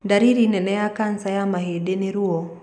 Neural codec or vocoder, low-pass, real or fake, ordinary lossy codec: none; 9.9 kHz; real; none